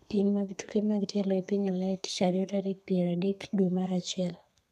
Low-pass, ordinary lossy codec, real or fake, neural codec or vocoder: 14.4 kHz; none; fake; codec, 32 kHz, 1.9 kbps, SNAC